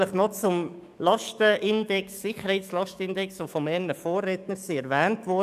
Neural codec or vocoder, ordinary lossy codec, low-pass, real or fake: codec, 44.1 kHz, 7.8 kbps, DAC; none; 14.4 kHz; fake